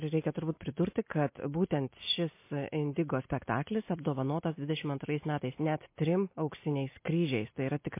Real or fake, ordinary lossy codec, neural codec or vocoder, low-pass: real; MP3, 24 kbps; none; 3.6 kHz